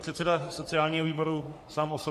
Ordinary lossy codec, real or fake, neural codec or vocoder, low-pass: MP3, 64 kbps; fake; codec, 44.1 kHz, 3.4 kbps, Pupu-Codec; 14.4 kHz